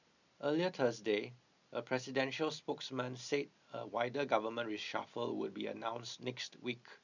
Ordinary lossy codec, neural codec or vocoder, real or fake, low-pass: none; none; real; 7.2 kHz